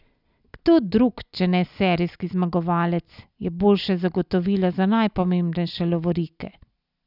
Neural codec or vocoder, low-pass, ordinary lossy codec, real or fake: none; 5.4 kHz; none; real